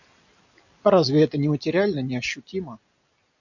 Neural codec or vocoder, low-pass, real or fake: none; 7.2 kHz; real